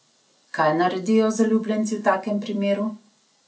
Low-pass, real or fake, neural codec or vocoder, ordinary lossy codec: none; real; none; none